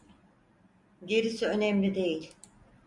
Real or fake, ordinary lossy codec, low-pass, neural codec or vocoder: real; MP3, 64 kbps; 10.8 kHz; none